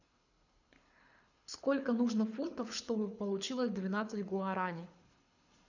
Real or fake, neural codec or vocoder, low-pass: fake; codec, 24 kHz, 6 kbps, HILCodec; 7.2 kHz